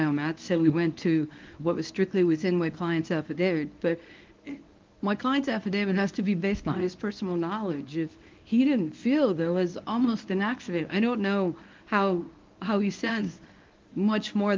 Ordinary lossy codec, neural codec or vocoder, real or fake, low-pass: Opus, 32 kbps; codec, 24 kHz, 0.9 kbps, WavTokenizer, medium speech release version 1; fake; 7.2 kHz